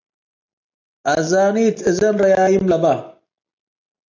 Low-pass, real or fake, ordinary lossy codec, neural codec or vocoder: 7.2 kHz; real; AAC, 48 kbps; none